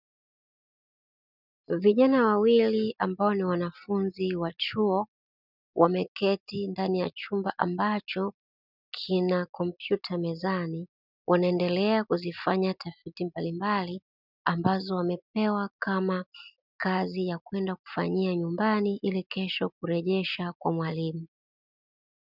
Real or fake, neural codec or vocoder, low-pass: real; none; 5.4 kHz